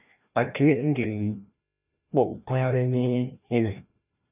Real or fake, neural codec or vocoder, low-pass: fake; codec, 16 kHz, 1 kbps, FreqCodec, larger model; 3.6 kHz